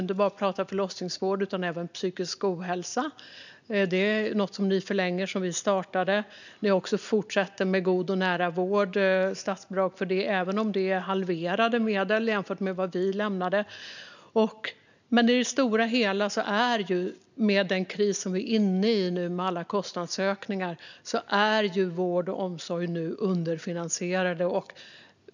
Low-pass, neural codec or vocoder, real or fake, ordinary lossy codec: 7.2 kHz; none; real; none